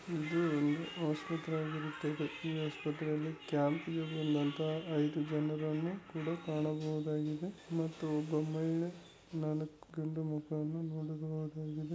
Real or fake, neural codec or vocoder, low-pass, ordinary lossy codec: real; none; none; none